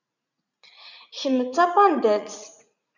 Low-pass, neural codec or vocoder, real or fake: 7.2 kHz; vocoder, 44.1 kHz, 80 mel bands, Vocos; fake